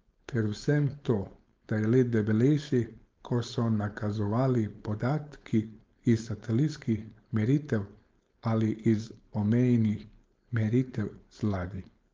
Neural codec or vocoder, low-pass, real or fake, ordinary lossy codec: codec, 16 kHz, 4.8 kbps, FACodec; 7.2 kHz; fake; Opus, 24 kbps